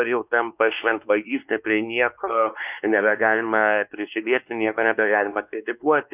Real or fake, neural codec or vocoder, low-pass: fake; codec, 16 kHz, 2 kbps, X-Codec, WavLM features, trained on Multilingual LibriSpeech; 3.6 kHz